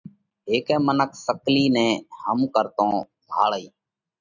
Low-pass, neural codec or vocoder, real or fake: 7.2 kHz; none; real